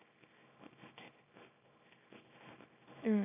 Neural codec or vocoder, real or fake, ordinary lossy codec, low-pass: codec, 24 kHz, 0.9 kbps, WavTokenizer, small release; fake; AAC, 24 kbps; 3.6 kHz